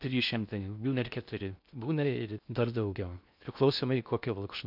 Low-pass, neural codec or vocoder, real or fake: 5.4 kHz; codec, 16 kHz in and 24 kHz out, 0.6 kbps, FocalCodec, streaming, 4096 codes; fake